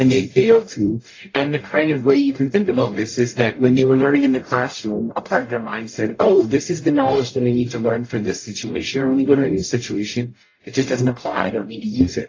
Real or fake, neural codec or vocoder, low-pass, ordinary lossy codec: fake; codec, 44.1 kHz, 0.9 kbps, DAC; 7.2 kHz; AAC, 32 kbps